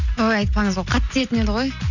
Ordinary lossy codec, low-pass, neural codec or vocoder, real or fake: none; 7.2 kHz; none; real